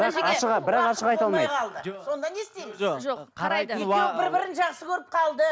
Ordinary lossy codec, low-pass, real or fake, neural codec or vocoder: none; none; real; none